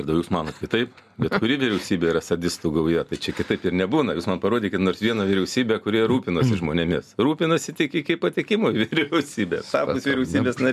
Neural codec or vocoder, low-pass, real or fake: none; 14.4 kHz; real